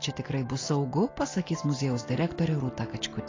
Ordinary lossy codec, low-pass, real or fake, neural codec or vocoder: AAC, 32 kbps; 7.2 kHz; real; none